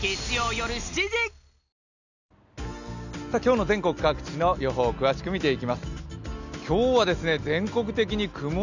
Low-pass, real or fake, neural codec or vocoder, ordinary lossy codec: 7.2 kHz; real; none; none